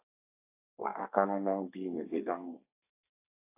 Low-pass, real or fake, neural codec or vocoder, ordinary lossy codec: 3.6 kHz; fake; codec, 32 kHz, 1.9 kbps, SNAC; AAC, 32 kbps